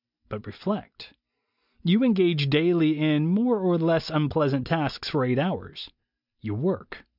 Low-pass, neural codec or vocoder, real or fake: 5.4 kHz; none; real